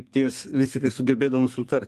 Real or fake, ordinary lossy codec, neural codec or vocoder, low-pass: fake; Opus, 24 kbps; codec, 32 kHz, 1.9 kbps, SNAC; 14.4 kHz